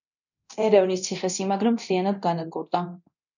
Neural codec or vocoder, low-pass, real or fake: codec, 24 kHz, 0.9 kbps, DualCodec; 7.2 kHz; fake